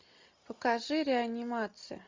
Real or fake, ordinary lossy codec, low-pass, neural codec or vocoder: real; MP3, 64 kbps; 7.2 kHz; none